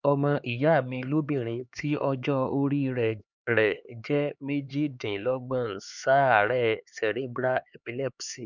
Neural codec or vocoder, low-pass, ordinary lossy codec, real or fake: codec, 16 kHz, 4 kbps, X-Codec, HuBERT features, trained on LibriSpeech; 7.2 kHz; none; fake